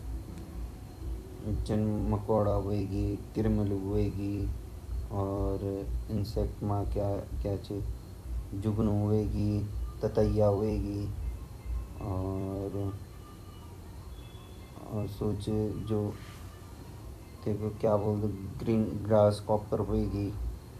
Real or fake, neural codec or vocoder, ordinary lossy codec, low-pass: fake; vocoder, 44.1 kHz, 128 mel bands every 256 samples, BigVGAN v2; AAC, 96 kbps; 14.4 kHz